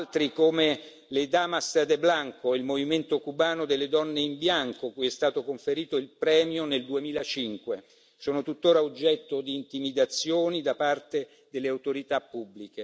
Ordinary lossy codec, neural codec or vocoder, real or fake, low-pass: none; none; real; none